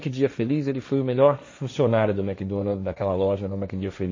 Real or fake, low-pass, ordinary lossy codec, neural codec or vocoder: fake; 7.2 kHz; MP3, 32 kbps; codec, 16 kHz, 1.1 kbps, Voila-Tokenizer